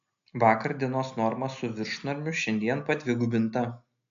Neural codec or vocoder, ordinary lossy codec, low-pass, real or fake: none; AAC, 64 kbps; 7.2 kHz; real